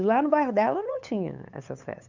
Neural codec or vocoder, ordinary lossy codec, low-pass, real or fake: codec, 16 kHz, 8 kbps, FunCodec, trained on LibriTTS, 25 frames a second; none; 7.2 kHz; fake